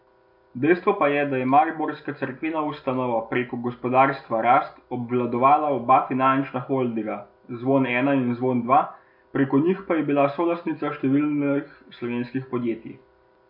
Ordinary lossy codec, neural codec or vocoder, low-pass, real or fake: MP3, 48 kbps; none; 5.4 kHz; real